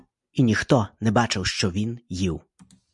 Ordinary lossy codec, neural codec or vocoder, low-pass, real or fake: MP3, 96 kbps; none; 10.8 kHz; real